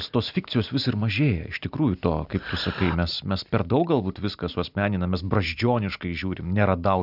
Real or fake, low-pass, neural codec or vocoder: real; 5.4 kHz; none